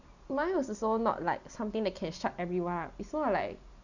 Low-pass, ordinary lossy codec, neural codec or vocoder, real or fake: 7.2 kHz; none; none; real